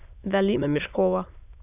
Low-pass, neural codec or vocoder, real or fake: 3.6 kHz; autoencoder, 22.05 kHz, a latent of 192 numbers a frame, VITS, trained on many speakers; fake